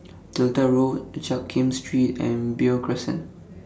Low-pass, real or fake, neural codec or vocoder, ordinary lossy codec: none; real; none; none